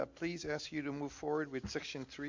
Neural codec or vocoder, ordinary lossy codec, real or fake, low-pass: none; MP3, 48 kbps; real; 7.2 kHz